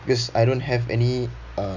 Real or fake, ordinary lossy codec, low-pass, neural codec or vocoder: real; none; 7.2 kHz; none